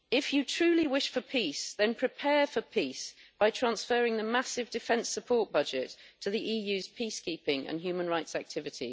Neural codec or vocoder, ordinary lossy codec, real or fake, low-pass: none; none; real; none